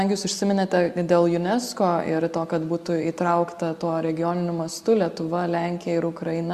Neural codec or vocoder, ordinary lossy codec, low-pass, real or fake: none; Opus, 64 kbps; 14.4 kHz; real